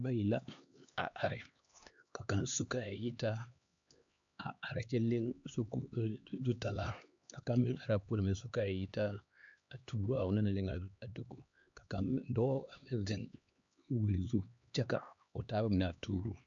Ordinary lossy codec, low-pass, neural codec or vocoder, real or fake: AAC, 64 kbps; 7.2 kHz; codec, 16 kHz, 2 kbps, X-Codec, HuBERT features, trained on LibriSpeech; fake